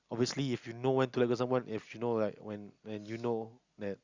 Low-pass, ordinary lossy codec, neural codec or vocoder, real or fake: 7.2 kHz; Opus, 64 kbps; none; real